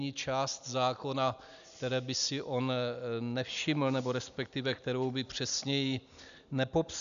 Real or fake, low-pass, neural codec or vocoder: real; 7.2 kHz; none